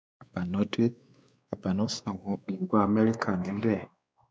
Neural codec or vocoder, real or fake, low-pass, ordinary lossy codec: codec, 16 kHz, 2 kbps, X-Codec, WavLM features, trained on Multilingual LibriSpeech; fake; none; none